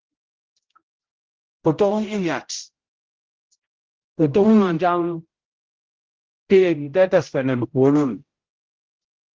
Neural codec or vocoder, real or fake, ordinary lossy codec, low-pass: codec, 16 kHz, 0.5 kbps, X-Codec, HuBERT features, trained on general audio; fake; Opus, 16 kbps; 7.2 kHz